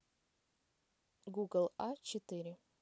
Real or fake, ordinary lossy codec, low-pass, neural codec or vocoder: real; none; none; none